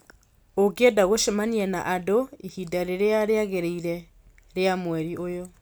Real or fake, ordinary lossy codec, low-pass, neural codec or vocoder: real; none; none; none